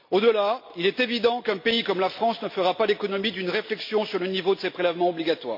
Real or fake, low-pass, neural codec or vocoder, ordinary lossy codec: real; 5.4 kHz; none; MP3, 32 kbps